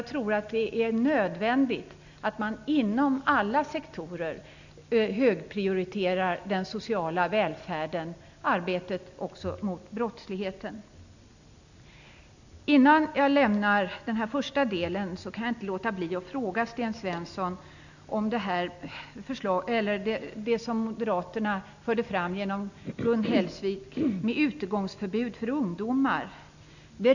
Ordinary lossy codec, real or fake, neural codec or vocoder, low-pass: none; real; none; 7.2 kHz